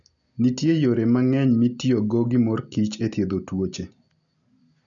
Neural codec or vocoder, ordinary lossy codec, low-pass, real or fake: none; none; 7.2 kHz; real